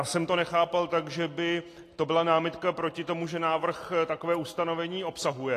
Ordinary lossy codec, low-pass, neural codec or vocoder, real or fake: AAC, 48 kbps; 14.4 kHz; none; real